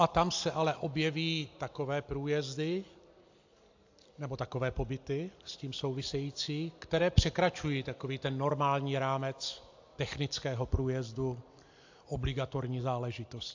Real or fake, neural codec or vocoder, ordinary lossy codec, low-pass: real; none; AAC, 48 kbps; 7.2 kHz